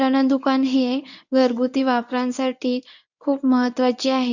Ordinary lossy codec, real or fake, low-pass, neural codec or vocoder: none; fake; 7.2 kHz; codec, 24 kHz, 0.9 kbps, WavTokenizer, medium speech release version 1